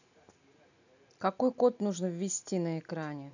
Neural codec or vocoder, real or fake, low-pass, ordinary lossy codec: none; real; 7.2 kHz; none